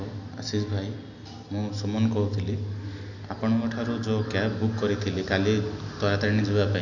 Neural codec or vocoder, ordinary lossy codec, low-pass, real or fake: none; none; 7.2 kHz; real